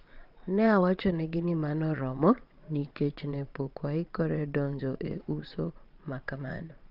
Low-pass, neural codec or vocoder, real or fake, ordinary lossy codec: 5.4 kHz; vocoder, 22.05 kHz, 80 mel bands, Vocos; fake; Opus, 24 kbps